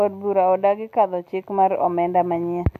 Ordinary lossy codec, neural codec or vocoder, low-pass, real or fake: MP3, 64 kbps; none; 14.4 kHz; real